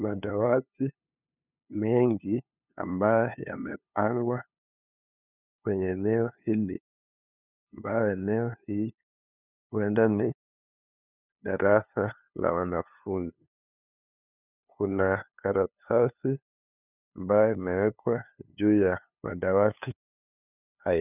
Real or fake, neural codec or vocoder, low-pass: fake; codec, 16 kHz, 2 kbps, FunCodec, trained on LibriTTS, 25 frames a second; 3.6 kHz